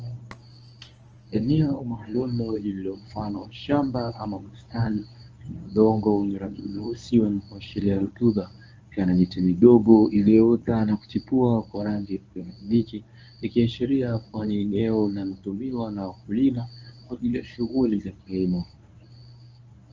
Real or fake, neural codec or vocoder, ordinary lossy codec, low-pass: fake; codec, 24 kHz, 0.9 kbps, WavTokenizer, medium speech release version 1; Opus, 24 kbps; 7.2 kHz